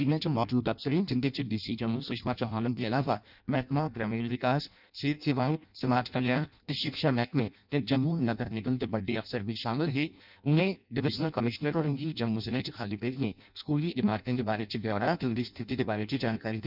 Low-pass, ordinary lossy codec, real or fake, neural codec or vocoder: 5.4 kHz; none; fake; codec, 16 kHz in and 24 kHz out, 0.6 kbps, FireRedTTS-2 codec